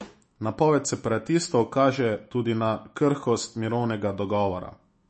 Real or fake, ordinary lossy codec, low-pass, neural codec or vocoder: fake; MP3, 32 kbps; 10.8 kHz; autoencoder, 48 kHz, 128 numbers a frame, DAC-VAE, trained on Japanese speech